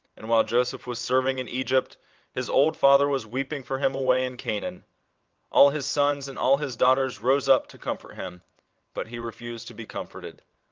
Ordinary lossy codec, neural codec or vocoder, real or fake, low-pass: Opus, 32 kbps; vocoder, 22.05 kHz, 80 mel bands, WaveNeXt; fake; 7.2 kHz